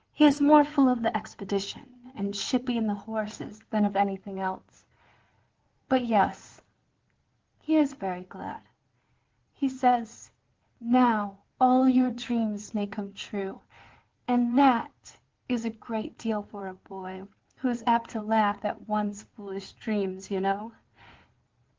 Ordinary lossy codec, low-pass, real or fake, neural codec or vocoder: Opus, 16 kbps; 7.2 kHz; fake; codec, 16 kHz in and 24 kHz out, 2.2 kbps, FireRedTTS-2 codec